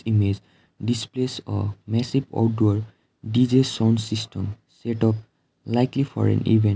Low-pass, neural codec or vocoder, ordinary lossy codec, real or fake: none; none; none; real